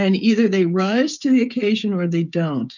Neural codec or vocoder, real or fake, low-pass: codec, 16 kHz, 8 kbps, FreqCodec, smaller model; fake; 7.2 kHz